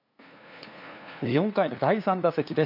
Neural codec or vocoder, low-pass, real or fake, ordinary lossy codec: codec, 16 kHz, 2 kbps, FunCodec, trained on LibriTTS, 25 frames a second; 5.4 kHz; fake; none